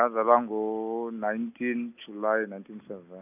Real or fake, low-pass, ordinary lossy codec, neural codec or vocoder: real; 3.6 kHz; none; none